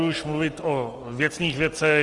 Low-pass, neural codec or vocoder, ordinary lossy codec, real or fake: 10.8 kHz; none; Opus, 24 kbps; real